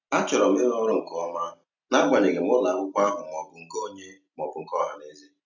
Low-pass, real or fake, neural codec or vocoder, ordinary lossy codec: 7.2 kHz; real; none; none